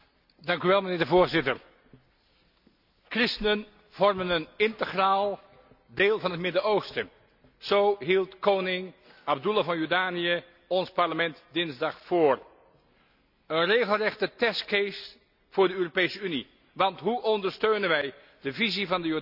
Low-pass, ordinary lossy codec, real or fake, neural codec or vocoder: 5.4 kHz; none; real; none